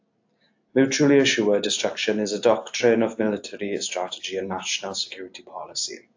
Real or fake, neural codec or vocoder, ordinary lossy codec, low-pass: real; none; AAC, 48 kbps; 7.2 kHz